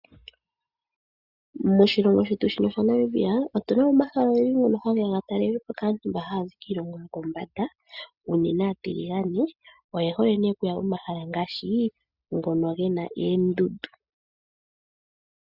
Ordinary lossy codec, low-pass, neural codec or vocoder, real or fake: Opus, 64 kbps; 5.4 kHz; none; real